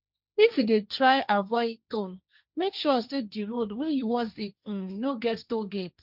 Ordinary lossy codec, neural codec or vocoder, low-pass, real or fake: none; codec, 16 kHz, 1.1 kbps, Voila-Tokenizer; 5.4 kHz; fake